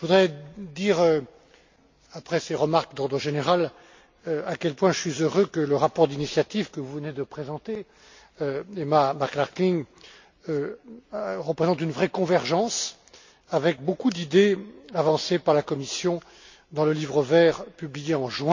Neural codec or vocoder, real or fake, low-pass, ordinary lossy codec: none; real; 7.2 kHz; MP3, 48 kbps